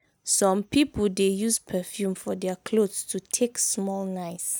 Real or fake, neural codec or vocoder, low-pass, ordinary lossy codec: real; none; none; none